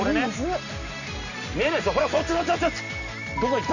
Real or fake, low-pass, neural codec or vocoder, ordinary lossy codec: real; 7.2 kHz; none; none